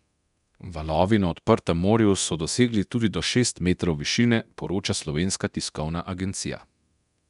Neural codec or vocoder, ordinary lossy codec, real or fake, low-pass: codec, 24 kHz, 0.9 kbps, DualCodec; none; fake; 10.8 kHz